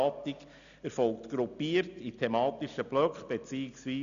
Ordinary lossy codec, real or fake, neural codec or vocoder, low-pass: MP3, 96 kbps; real; none; 7.2 kHz